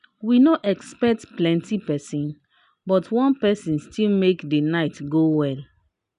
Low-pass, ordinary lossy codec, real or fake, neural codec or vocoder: 10.8 kHz; none; real; none